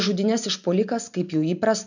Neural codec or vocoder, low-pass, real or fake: none; 7.2 kHz; real